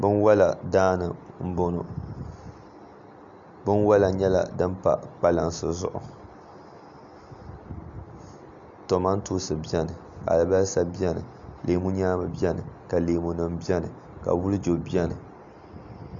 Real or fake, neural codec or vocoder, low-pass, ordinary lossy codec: real; none; 7.2 kHz; AAC, 64 kbps